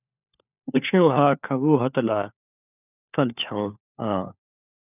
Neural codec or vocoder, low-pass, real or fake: codec, 16 kHz, 4 kbps, FunCodec, trained on LibriTTS, 50 frames a second; 3.6 kHz; fake